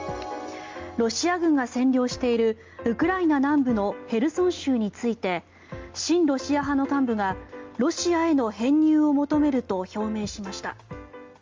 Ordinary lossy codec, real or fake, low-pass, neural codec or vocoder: Opus, 32 kbps; real; 7.2 kHz; none